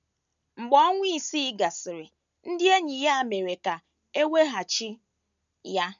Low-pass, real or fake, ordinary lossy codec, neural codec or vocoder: 7.2 kHz; real; none; none